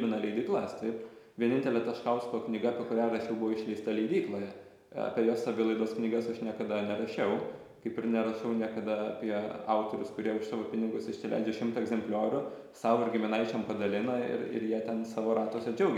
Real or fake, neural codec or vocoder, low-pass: real; none; 19.8 kHz